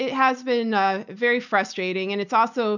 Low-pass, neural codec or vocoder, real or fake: 7.2 kHz; none; real